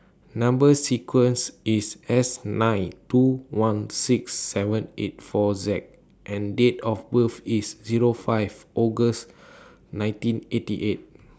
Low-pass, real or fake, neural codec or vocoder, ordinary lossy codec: none; real; none; none